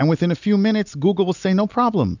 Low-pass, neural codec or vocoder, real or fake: 7.2 kHz; none; real